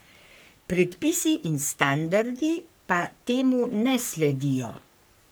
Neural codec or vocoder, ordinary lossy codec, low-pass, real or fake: codec, 44.1 kHz, 3.4 kbps, Pupu-Codec; none; none; fake